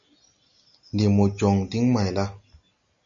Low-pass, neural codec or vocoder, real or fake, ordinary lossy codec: 7.2 kHz; none; real; AAC, 64 kbps